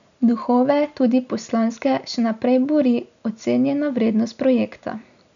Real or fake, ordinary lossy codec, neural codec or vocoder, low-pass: real; none; none; 7.2 kHz